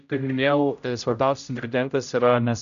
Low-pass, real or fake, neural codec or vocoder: 7.2 kHz; fake; codec, 16 kHz, 0.5 kbps, X-Codec, HuBERT features, trained on general audio